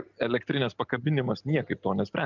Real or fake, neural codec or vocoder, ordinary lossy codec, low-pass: real; none; Opus, 32 kbps; 7.2 kHz